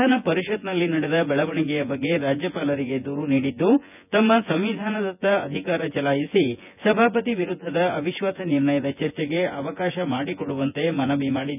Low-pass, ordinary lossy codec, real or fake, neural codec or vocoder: 3.6 kHz; none; fake; vocoder, 24 kHz, 100 mel bands, Vocos